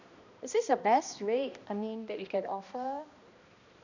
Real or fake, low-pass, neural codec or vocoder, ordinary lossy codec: fake; 7.2 kHz; codec, 16 kHz, 1 kbps, X-Codec, HuBERT features, trained on balanced general audio; none